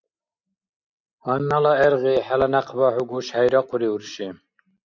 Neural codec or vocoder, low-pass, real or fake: none; 7.2 kHz; real